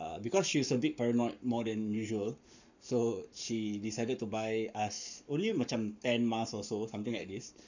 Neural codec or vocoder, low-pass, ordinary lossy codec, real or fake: codec, 44.1 kHz, 7.8 kbps, Pupu-Codec; 7.2 kHz; none; fake